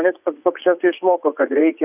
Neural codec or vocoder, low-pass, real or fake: vocoder, 44.1 kHz, 80 mel bands, Vocos; 3.6 kHz; fake